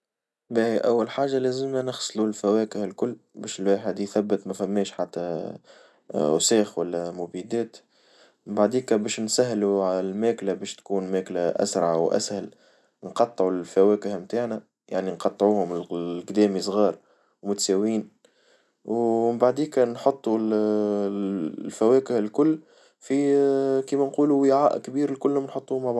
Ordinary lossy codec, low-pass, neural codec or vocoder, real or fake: none; none; none; real